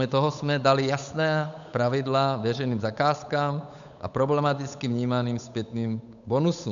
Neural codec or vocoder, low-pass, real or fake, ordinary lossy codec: codec, 16 kHz, 8 kbps, FunCodec, trained on Chinese and English, 25 frames a second; 7.2 kHz; fake; MP3, 96 kbps